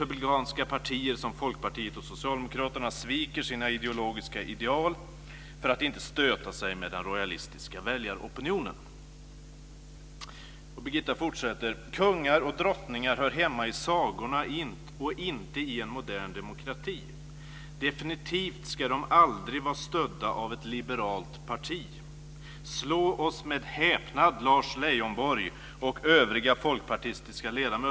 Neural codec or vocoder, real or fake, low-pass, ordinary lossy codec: none; real; none; none